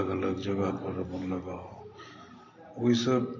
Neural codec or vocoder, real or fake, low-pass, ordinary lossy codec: vocoder, 44.1 kHz, 128 mel bands every 512 samples, BigVGAN v2; fake; 7.2 kHz; MP3, 32 kbps